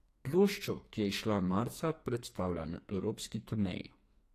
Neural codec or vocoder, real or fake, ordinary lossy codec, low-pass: codec, 44.1 kHz, 2.6 kbps, SNAC; fake; AAC, 48 kbps; 14.4 kHz